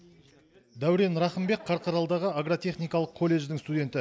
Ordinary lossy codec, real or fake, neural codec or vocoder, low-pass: none; real; none; none